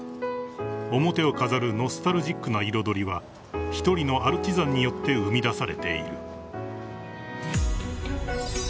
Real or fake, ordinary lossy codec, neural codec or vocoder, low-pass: real; none; none; none